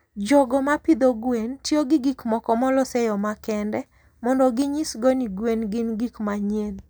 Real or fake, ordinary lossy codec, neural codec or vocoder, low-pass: real; none; none; none